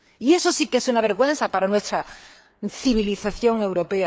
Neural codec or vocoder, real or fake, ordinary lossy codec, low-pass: codec, 16 kHz, 4 kbps, FreqCodec, larger model; fake; none; none